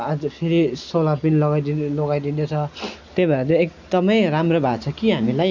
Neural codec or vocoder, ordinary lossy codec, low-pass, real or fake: none; none; 7.2 kHz; real